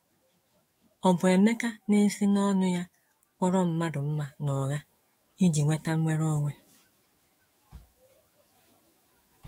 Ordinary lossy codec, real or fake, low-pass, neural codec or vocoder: AAC, 48 kbps; fake; 19.8 kHz; autoencoder, 48 kHz, 128 numbers a frame, DAC-VAE, trained on Japanese speech